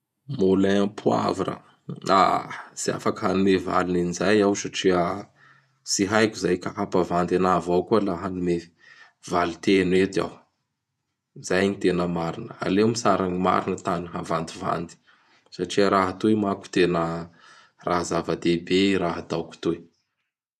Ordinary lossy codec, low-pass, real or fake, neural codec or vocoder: none; 14.4 kHz; fake; vocoder, 48 kHz, 128 mel bands, Vocos